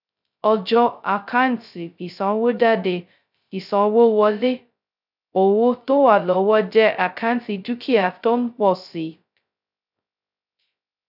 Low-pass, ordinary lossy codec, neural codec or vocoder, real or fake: 5.4 kHz; none; codec, 16 kHz, 0.2 kbps, FocalCodec; fake